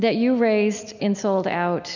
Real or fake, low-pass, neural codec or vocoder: real; 7.2 kHz; none